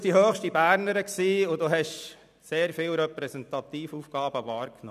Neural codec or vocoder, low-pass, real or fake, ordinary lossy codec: none; 14.4 kHz; real; none